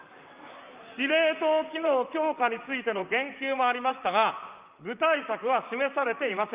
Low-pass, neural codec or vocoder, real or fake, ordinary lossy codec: 3.6 kHz; vocoder, 44.1 kHz, 128 mel bands, Pupu-Vocoder; fake; Opus, 24 kbps